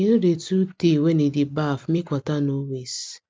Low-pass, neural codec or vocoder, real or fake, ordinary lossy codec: none; none; real; none